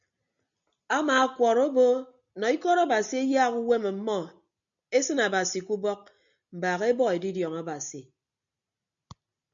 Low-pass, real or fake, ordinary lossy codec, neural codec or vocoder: 7.2 kHz; real; MP3, 96 kbps; none